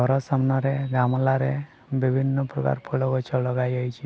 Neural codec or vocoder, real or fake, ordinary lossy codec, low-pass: none; real; none; none